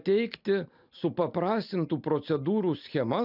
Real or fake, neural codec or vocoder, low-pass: real; none; 5.4 kHz